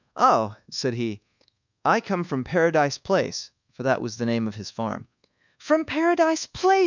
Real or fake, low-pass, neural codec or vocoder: fake; 7.2 kHz; codec, 24 kHz, 1.2 kbps, DualCodec